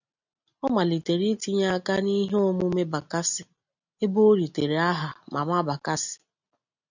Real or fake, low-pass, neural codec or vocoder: real; 7.2 kHz; none